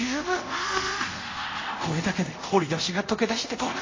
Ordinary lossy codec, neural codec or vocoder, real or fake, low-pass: MP3, 32 kbps; codec, 24 kHz, 0.5 kbps, DualCodec; fake; 7.2 kHz